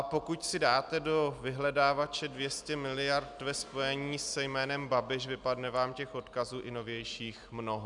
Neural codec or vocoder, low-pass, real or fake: none; 10.8 kHz; real